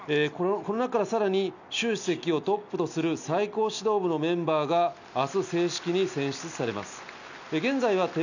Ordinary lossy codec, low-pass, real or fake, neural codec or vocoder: none; 7.2 kHz; real; none